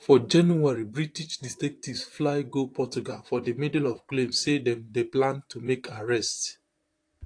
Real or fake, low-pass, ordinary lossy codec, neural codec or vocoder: fake; 9.9 kHz; AAC, 48 kbps; vocoder, 44.1 kHz, 128 mel bands, Pupu-Vocoder